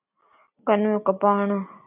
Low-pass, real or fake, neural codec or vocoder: 3.6 kHz; real; none